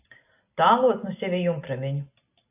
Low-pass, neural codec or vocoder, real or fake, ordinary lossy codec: 3.6 kHz; none; real; AAC, 32 kbps